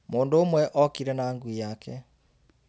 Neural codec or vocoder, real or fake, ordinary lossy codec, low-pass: none; real; none; none